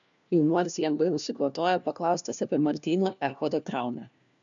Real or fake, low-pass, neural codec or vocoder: fake; 7.2 kHz; codec, 16 kHz, 1 kbps, FunCodec, trained on LibriTTS, 50 frames a second